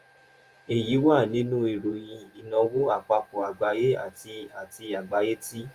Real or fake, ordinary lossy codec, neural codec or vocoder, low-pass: fake; Opus, 32 kbps; vocoder, 48 kHz, 128 mel bands, Vocos; 14.4 kHz